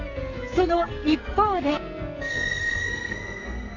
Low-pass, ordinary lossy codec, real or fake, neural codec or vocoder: 7.2 kHz; AAC, 48 kbps; fake; codec, 44.1 kHz, 2.6 kbps, SNAC